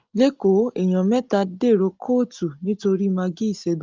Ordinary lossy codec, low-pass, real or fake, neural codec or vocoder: Opus, 32 kbps; 7.2 kHz; real; none